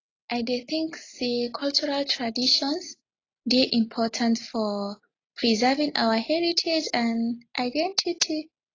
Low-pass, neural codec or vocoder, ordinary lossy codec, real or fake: 7.2 kHz; none; AAC, 32 kbps; real